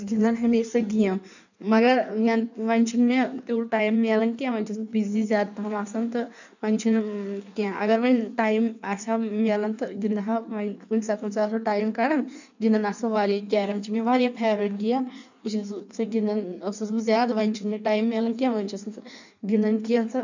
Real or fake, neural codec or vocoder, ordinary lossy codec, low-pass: fake; codec, 16 kHz in and 24 kHz out, 1.1 kbps, FireRedTTS-2 codec; none; 7.2 kHz